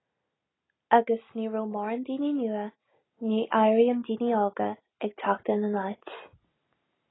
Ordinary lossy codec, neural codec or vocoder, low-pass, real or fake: AAC, 16 kbps; none; 7.2 kHz; real